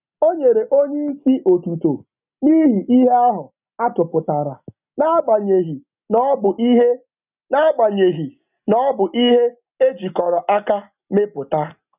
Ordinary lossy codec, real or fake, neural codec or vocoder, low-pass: none; real; none; 3.6 kHz